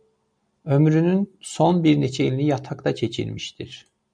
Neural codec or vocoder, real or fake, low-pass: none; real; 9.9 kHz